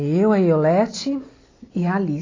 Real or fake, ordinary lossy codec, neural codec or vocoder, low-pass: real; AAC, 32 kbps; none; 7.2 kHz